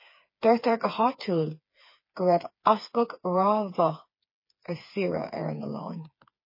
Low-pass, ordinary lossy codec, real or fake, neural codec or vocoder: 5.4 kHz; MP3, 24 kbps; fake; codec, 16 kHz, 4 kbps, FreqCodec, smaller model